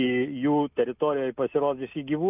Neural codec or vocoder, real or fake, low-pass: none; real; 3.6 kHz